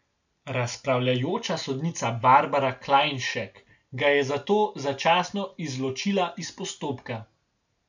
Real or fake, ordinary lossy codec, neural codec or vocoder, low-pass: real; none; none; 7.2 kHz